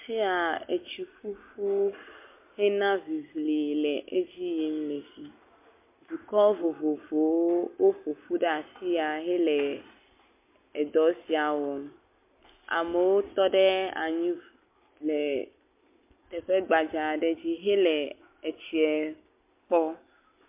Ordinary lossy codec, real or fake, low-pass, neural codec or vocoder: MP3, 24 kbps; real; 3.6 kHz; none